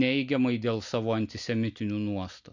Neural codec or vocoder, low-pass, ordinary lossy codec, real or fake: none; 7.2 kHz; Opus, 64 kbps; real